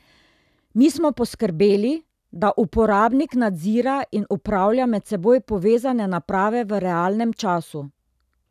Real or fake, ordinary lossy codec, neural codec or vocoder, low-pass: real; none; none; 14.4 kHz